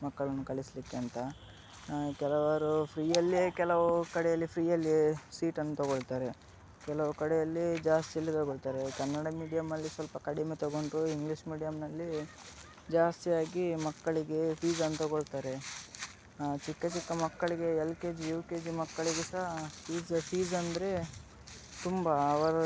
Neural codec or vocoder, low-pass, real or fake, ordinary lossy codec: none; none; real; none